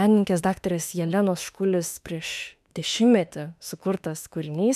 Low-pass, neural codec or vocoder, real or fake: 14.4 kHz; autoencoder, 48 kHz, 32 numbers a frame, DAC-VAE, trained on Japanese speech; fake